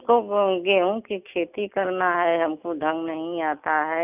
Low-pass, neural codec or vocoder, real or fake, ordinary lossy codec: 3.6 kHz; none; real; none